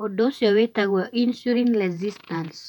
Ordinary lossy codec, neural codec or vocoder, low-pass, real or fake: none; vocoder, 48 kHz, 128 mel bands, Vocos; 19.8 kHz; fake